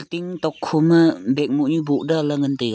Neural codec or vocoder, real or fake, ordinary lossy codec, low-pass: none; real; none; none